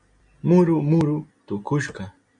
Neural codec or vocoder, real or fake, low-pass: none; real; 9.9 kHz